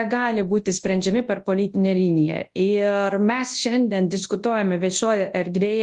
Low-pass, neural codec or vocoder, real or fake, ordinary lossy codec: 10.8 kHz; codec, 24 kHz, 0.9 kbps, WavTokenizer, large speech release; fake; Opus, 24 kbps